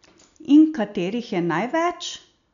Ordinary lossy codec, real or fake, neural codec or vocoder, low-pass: none; real; none; 7.2 kHz